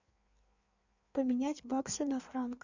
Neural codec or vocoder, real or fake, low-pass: codec, 16 kHz in and 24 kHz out, 1.1 kbps, FireRedTTS-2 codec; fake; 7.2 kHz